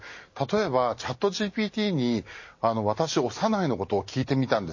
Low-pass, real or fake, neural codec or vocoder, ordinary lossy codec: 7.2 kHz; real; none; MP3, 32 kbps